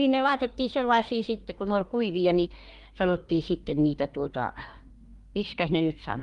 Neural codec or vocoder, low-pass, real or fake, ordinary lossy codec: codec, 24 kHz, 1 kbps, SNAC; 10.8 kHz; fake; Opus, 32 kbps